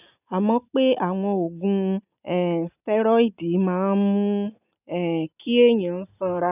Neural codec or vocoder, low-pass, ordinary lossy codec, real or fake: none; 3.6 kHz; none; real